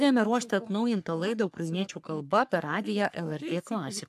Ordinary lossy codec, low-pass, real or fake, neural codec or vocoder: AAC, 96 kbps; 14.4 kHz; fake; codec, 44.1 kHz, 3.4 kbps, Pupu-Codec